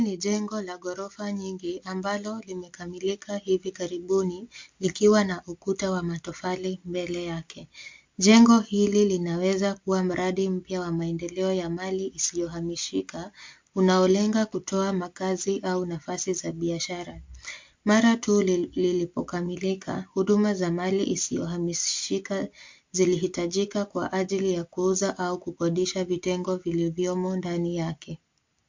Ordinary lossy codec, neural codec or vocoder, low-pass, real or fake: MP3, 48 kbps; none; 7.2 kHz; real